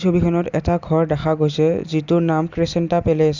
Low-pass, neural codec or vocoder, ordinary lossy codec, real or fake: 7.2 kHz; none; Opus, 64 kbps; real